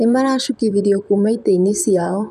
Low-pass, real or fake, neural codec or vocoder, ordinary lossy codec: 14.4 kHz; fake; vocoder, 44.1 kHz, 128 mel bands, Pupu-Vocoder; none